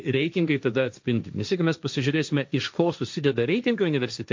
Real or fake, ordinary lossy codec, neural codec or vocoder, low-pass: fake; MP3, 48 kbps; codec, 16 kHz, 1.1 kbps, Voila-Tokenizer; 7.2 kHz